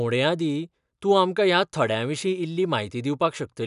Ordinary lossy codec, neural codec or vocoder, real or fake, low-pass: none; none; real; 10.8 kHz